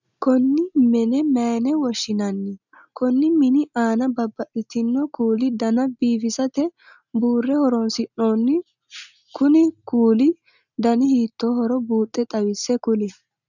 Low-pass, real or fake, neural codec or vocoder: 7.2 kHz; real; none